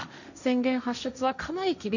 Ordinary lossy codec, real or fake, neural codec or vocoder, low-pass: none; fake; codec, 16 kHz, 1.1 kbps, Voila-Tokenizer; 7.2 kHz